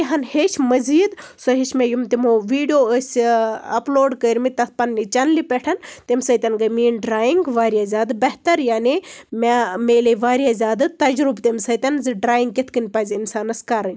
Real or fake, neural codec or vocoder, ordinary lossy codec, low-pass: real; none; none; none